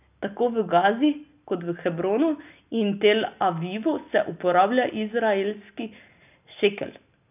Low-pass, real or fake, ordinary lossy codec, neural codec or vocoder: 3.6 kHz; real; none; none